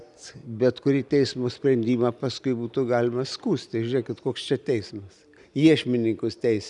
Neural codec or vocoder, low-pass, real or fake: none; 10.8 kHz; real